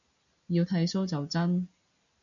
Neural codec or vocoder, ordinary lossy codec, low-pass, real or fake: none; MP3, 96 kbps; 7.2 kHz; real